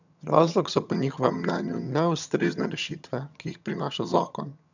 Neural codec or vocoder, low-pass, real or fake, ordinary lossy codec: vocoder, 22.05 kHz, 80 mel bands, HiFi-GAN; 7.2 kHz; fake; none